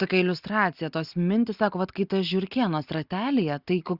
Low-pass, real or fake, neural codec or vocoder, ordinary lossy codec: 5.4 kHz; real; none; Opus, 64 kbps